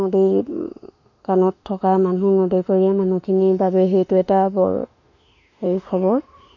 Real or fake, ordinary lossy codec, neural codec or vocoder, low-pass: fake; none; autoencoder, 48 kHz, 32 numbers a frame, DAC-VAE, trained on Japanese speech; 7.2 kHz